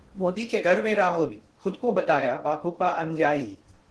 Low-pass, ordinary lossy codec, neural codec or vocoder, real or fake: 10.8 kHz; Opus, 16 kbps; codec, 16 kHz in and 24 kHz out, 0.6 kbps, FocalCodec, streaming, 2048 codes; fake